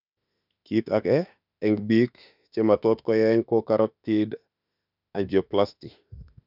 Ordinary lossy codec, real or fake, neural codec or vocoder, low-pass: none; fake; autoencoder, 48 kHz, 32 numbers a frame, DAC-VAE, trained on Japanese speech; 5.4 kHz